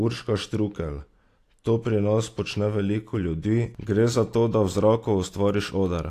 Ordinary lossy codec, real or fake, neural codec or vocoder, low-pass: AAC, 64 kbps; real; none; 14.4 kHz